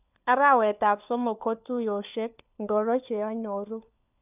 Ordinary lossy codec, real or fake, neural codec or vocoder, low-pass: none; fake; codec, 16 kHz, 4 kbps, FunCodec, trained on LibriTTS, 50 frames a second; 3.6 kHz